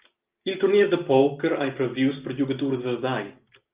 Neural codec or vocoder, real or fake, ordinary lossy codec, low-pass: none; real; Opus, 32 kbps; 3.6 kHz